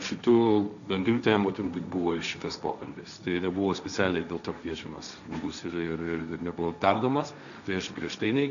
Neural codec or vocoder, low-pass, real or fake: codec, 16 kHz, 1.1 kbps, Voila-Tokenizer; 7.2 kHz; fake